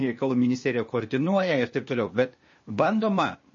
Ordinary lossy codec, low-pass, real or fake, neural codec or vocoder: MP3, 32 kbps; 7.2 kHz; fake; codec, 16 kHz, 0.8 kbps, ZipCodec